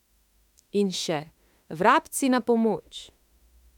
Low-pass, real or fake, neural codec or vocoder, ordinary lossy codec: 19.8 kHz; fake; autoencoder, 48 kHz, 32 numbers a frame, DAC-VAE, trained on Japanese speech; none